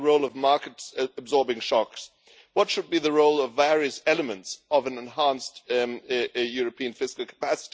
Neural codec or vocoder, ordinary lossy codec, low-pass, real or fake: none; none; none; real